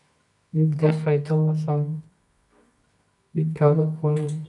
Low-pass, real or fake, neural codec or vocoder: 10.8 kHz; fake; codec, 24 kHz, 0.9 kbps, WavTokenizer, medium music audio release